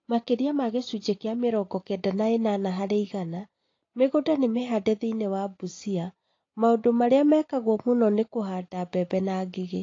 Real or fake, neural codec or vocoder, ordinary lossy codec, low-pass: real; none; AAC, 32 kbps; 7.2 kHz